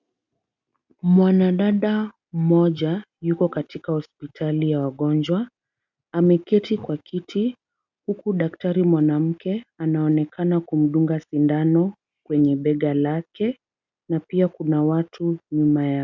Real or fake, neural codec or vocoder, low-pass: real; none; 7.2 kHz